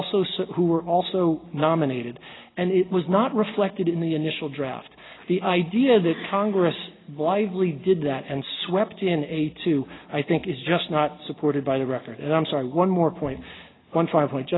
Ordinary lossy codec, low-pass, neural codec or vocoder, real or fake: AAC, 16 kbps; 7.2 kHz; none; real